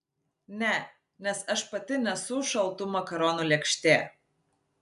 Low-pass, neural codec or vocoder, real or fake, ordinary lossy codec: 10.8 kHz; none; real; AAC, 96 kbps